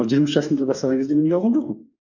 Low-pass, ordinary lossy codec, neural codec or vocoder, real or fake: 7.2 kHz; none; codec, 44.1 kHz, 2.6 kbps, DAC; fake